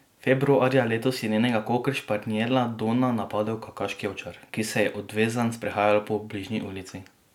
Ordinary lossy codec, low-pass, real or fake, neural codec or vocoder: none; 19.8 kHz; real; none